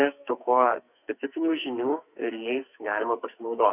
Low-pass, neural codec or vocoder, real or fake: 3.6 kHz; codec, 44.1 kHz, 2.6 kbps, SNAC; fake